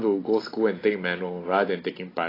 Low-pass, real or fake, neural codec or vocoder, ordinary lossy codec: 5.4 kHz; real; none; AAC, 24 kbps